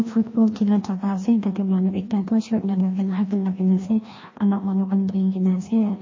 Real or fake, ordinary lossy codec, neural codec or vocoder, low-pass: fake; MP3, 32 kbps; codec, 16 kHz, 1 kbps, FreqCodec, larger model; 7.2 kHz